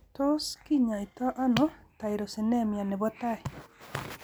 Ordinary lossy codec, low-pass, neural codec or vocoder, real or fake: none; none; none; real